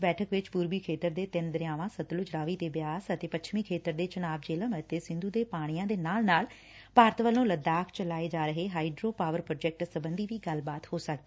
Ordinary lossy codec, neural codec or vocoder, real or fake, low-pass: none; none; real; none